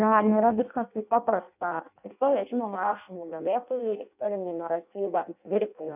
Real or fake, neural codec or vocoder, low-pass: fake; codec, 16 kHz in and 24 kHz out, 0.6 kbps, FireRedTTS-2 codec; 3.6 kHz